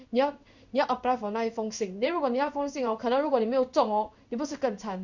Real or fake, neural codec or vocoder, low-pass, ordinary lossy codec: fake; codec, 16 kHz in and 24 kHz out, 1 kbps, XY-Tokenizer; 7.2 kHz; none